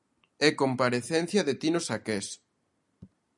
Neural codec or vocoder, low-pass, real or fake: none; 10.8 kHz; real